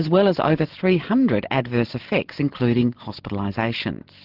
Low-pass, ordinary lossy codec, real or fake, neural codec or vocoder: 5.4 kHz; Opus, 16 kbps; real; none